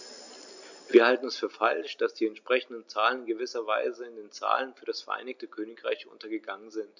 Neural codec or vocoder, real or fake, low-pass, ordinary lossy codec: none; real; 7.2 kHz; none